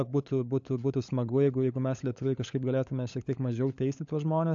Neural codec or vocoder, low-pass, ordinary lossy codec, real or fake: codec, 16 kHz, 16 kbps, FunCodec, trained on LibriTTS, 50 frames a second; 7.2 kHz; AAC, 64 kbps; fake